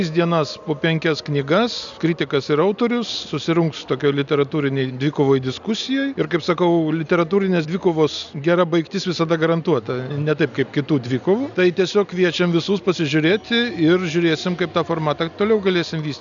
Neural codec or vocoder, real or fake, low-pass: none; real; 7.2 kHz